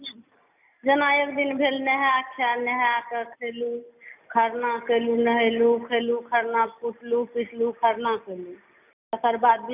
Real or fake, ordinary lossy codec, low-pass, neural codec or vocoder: real; none; 3.6 kHz; none